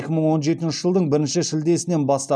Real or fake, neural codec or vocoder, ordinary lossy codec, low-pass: real; none; none; none